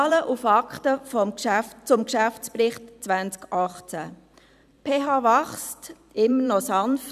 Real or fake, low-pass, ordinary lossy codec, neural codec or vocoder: fake; 14.4 kHz; none; vocoder, 44.1 kHz, 128 mel bands every 256 samples, BigVGAN v2